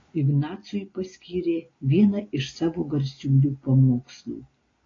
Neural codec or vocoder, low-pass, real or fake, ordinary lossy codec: none; 7.2 kHz; real; AAC, 32 kbps